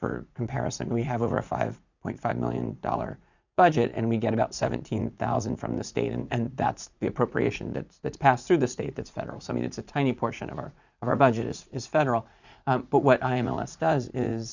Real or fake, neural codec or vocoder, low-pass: fake; vocoder, 44.1 kHz, 80 mel bands, Vocos; 7.2 kHz